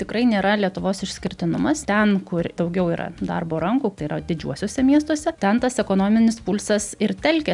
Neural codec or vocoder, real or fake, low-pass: none; real; 10.8 kHz